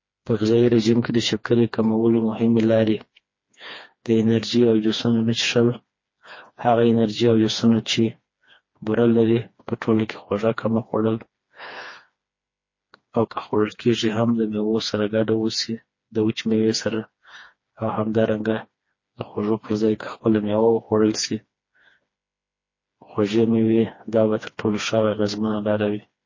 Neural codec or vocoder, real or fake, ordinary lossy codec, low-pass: codec, 16 kHz, 2 kbps, FreqCodec, smaller model; fake; MP3, 32 kbps; 7.2 kHz